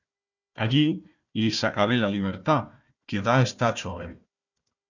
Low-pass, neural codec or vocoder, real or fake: 7.2 kHz; codec, 16 kHz, 1 kbps, FunCodec, trained on Chinese and English, 50 frames a second; fake